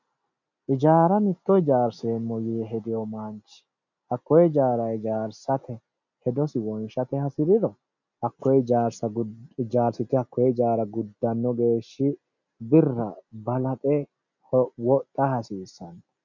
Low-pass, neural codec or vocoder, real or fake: 7.2 kHz; none; real